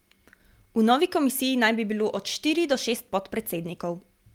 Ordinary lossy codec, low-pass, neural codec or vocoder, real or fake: Opus, 32 kbps; 19.8 kHz; none; real